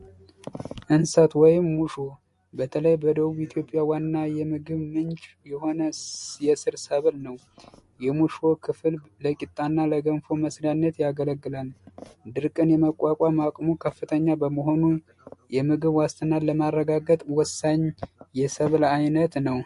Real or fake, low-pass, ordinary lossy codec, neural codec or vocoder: real; 14.4 kHz; MP3, 48 kbps; none